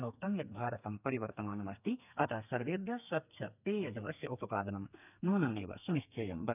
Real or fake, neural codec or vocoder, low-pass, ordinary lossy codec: fake; codec, 32 kHz, 1.9 kbps, SNAC; 3.6 kHz; none